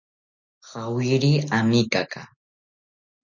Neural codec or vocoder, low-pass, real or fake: none; 7.2 kHz; real